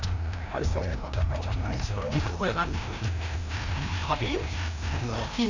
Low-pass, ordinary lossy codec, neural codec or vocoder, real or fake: 7.2 kHz; none; codec, 16 kHz, 1 kbps, FreqCodec, larger model; fake